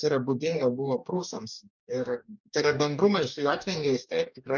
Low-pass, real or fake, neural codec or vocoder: 7.2 kHz; fake; codec, 44.1 kHz, 2.6 kbps, DAC